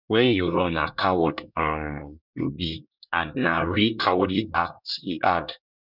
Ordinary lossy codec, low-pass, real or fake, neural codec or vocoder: none; 5.4 kHz; fake; codec, 24 kHz, 1 kbps, SNAC